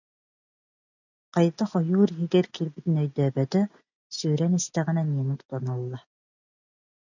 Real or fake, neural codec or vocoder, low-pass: real; none; 7.2 kHz